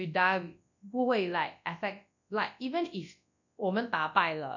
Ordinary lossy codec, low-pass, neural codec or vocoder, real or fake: none; 7.2 kHz; codec, 24 kHz, 0.9 kbps, WavTokenizer, large speech release; fake